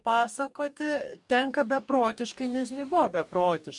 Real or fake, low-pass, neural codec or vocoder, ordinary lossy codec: fake; 10.8 kHz; codec, 44.1 kHz, 2.6 kbps, DAC; MP3, 64 kbps